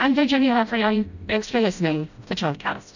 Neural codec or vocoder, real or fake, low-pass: codec, 16 kHz, 0.5 kbps, FreqCodec, smaller model; fake; 7.2 kHz